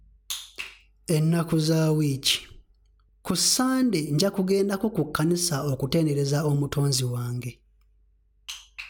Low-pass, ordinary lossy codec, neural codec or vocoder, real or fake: none; none; none; real